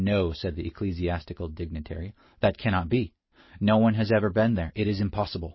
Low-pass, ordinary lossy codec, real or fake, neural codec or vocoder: 7.2 kHz; MP3, 24 kbps; real; none